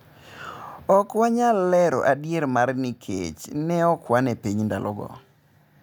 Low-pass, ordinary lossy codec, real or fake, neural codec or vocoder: none; none; real; none